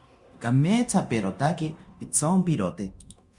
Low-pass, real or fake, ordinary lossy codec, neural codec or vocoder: 10.8 kHz; fake; Opus, 64 kbps; codec, 24 kHz, 0.9 kbps, DualCodec